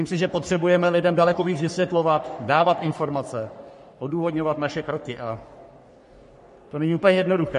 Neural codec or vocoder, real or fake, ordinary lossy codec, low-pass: codec, 44.1 kHz, 3.4 kbps, Pupu-Codec; fake; MP3, 48 kbps; 14.4 kHz